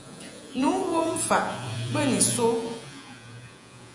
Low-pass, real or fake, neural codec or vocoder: 10.8 kHz; fake; vocoder, 48 kHz, 128 mel bands, Vocos